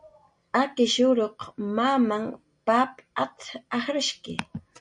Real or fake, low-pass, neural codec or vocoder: real; 9.9 kHz; none